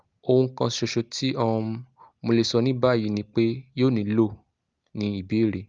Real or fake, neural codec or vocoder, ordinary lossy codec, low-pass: real; none; Opus, 32 kbps; 7.2 kHz